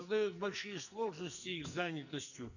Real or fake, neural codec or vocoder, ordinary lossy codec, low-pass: fake; codec, 44.1 kHz, 2.6 kbps, SNAC; none; 7.2 kHz